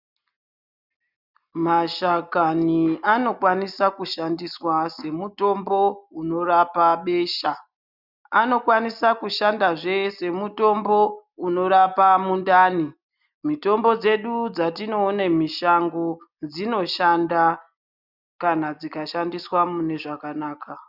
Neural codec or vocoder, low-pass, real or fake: none; 5.4 kHz; real